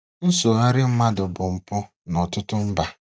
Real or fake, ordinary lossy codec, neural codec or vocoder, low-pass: real; none; none; none